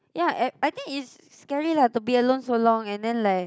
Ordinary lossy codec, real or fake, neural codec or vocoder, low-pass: none; real; none; none